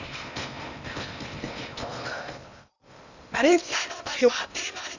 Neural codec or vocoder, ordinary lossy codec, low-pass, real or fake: codec, 16 kHz in and 24 kHz out, 0.8 kbps, FocalCodec, streaming, 65536 codes; none; 7.2 kHz; fake